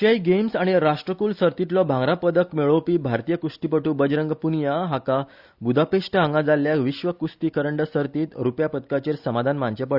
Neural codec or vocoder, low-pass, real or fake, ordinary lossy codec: none; 5.4 kHz; real; Opus, 64 kbps